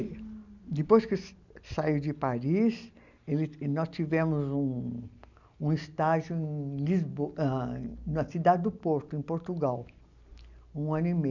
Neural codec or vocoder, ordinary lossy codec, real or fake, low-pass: none; none; real; 7.2 kHz